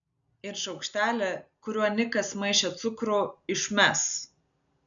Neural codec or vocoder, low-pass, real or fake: none; 7.2 kHz; real